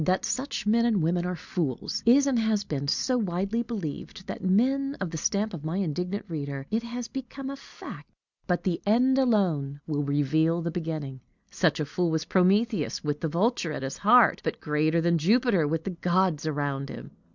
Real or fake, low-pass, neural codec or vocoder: real; 7.2 kHz; none